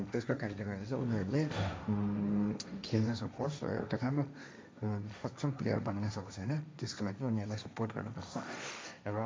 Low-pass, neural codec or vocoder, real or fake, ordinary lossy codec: none; codec, 16 kHz, 1.1 kbps, Voila-Tokenizer; fake; none